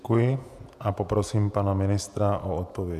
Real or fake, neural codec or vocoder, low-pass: fake; vocoder, 48 kHz, 128 mel bands, Vocos; 14.4 kHz